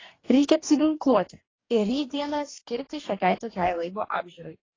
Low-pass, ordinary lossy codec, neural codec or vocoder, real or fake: 7.2 kHz; AAC, 32 kbps; codec, 44.1 kHz, 2.6 kbps, DAC; fake